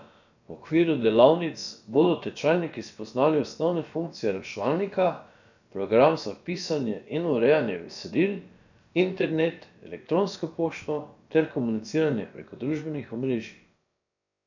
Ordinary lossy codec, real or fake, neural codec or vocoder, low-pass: none; fake; codec, 16 kHz, about 1 kbps, DyCAST, with the encoder's durations; 7.2 kHz